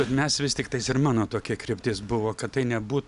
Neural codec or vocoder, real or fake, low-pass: none; real; 10.8 kHz